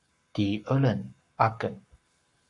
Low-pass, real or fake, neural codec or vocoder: 10.8 kHz; fake; codec, 44.1 kHz, 7.8 kbps, Pupu-Codec